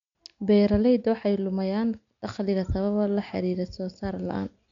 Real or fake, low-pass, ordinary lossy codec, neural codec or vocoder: real; 7.2 kHz; MP3, 64 kbps; none